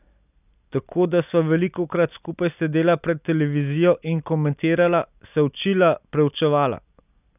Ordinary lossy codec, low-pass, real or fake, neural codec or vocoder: none; 3.6 kHz; real; none